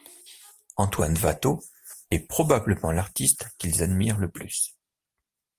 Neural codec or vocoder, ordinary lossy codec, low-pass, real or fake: none; Opus, 32 kbps; 14.4 kHz; real